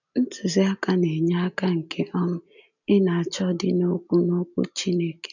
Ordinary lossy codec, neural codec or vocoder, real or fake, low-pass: none; none; real; 7.2 kHz